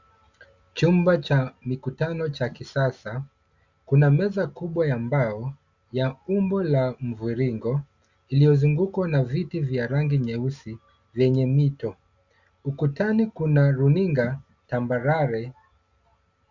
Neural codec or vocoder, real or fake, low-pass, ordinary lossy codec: none; real; 7.2 kHz; Opus, 64 kbps